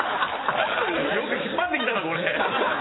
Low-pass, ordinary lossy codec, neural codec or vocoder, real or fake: 7.2 kHz; AAC, 16 kbps; none; real